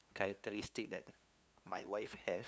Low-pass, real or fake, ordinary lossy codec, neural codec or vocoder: none; fake; none; codec, 16 kHz, 2 kbps, FunCodec, trained on LibriTTS, 25 frames a second